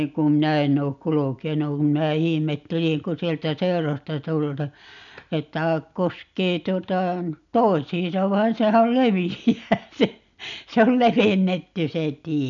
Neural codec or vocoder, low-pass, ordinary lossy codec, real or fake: none; 7.2 kHz; MP3, 96 kbps; real